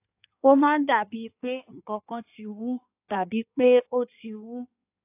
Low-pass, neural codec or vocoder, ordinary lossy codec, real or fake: 3.6 kHz; codec, 16 kHz in and 24 kHz out, 1.1 kbps, FireRedTTS-2 codec; AAC, 32 kbps; fake